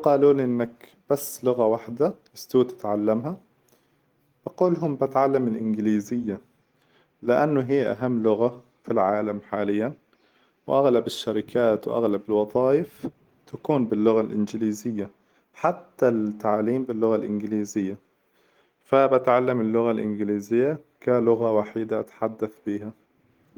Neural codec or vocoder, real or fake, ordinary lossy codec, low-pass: none; real; Opus, 24 kbps; 19.8 kHz